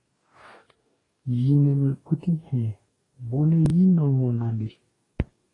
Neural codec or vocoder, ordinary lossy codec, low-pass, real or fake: codec, 44.1 kHz, 2.6 kbps, DAC; AAC, 32 kbps; 10.8 kHz; fake